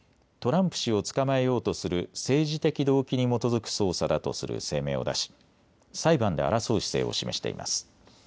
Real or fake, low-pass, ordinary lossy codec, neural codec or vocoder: real; none; none; none